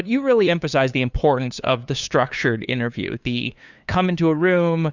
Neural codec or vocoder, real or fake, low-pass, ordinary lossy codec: codec, 16 kHz, 2 kbps, FunCodec, trained on LibriTTS, 25 frames a second; fake; 7.2 kHz; Opus, 64 kbps